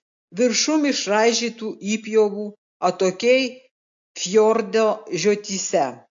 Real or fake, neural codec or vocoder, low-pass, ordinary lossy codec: real; none; 7.2 kHz; AAC, 64 kbps